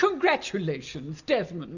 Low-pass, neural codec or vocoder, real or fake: 7.2 kHz; none; real